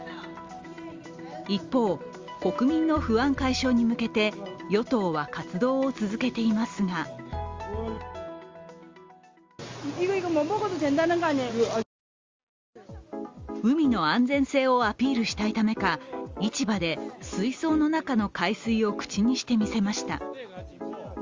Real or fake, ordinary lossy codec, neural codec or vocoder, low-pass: real; Opus, 32 kbps; none; 7.2 kHz